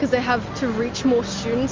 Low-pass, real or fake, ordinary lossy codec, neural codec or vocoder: 7.2 kHz; real; Opus, 32 kbps; none